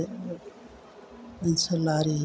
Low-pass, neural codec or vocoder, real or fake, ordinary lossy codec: none; none; real; none